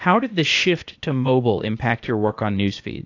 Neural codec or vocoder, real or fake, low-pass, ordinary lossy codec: codec, 16 kHz, 0.8 kbps, ZipCodec; fake; 7.2 kHz; AAC, 48 kbps